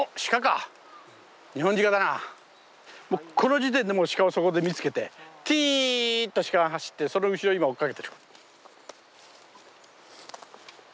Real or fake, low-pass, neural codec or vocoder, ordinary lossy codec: real; none; none; none